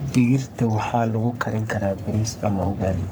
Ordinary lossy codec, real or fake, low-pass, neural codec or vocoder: none; fake; none; codec, 44.1 kHz, 3.4 kbps, Pupu-Codec